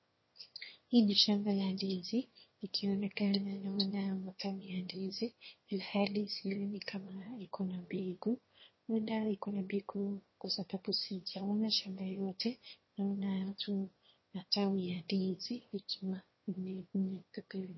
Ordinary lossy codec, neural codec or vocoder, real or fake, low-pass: MP3, 24 kbps; autoencoder, 22.05 kHz, a latent of 192 numbers a frame, VITS, trained on one speaker; fake; 7.2 kHz